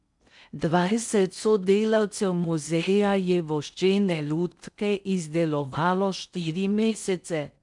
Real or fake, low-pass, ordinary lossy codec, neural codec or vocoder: fake; 10.8 kHz; none; codec, 16 kHz in and 24 kHz out, 0.6 kbps, FocalCodec, streaming, 4096 codes